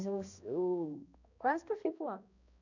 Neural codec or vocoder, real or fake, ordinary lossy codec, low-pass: codec, 16 kHz, 1 kbps, X-Codec, HuBERT features, trained on balanced general audio; fake; none; 7.2 kHz